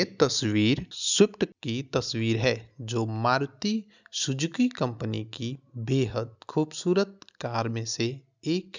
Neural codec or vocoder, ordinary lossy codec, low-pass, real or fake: none; none; 7.2 kHz; real